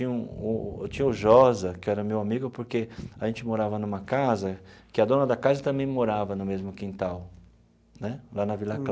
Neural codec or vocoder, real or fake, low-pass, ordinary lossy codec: none; real; none; none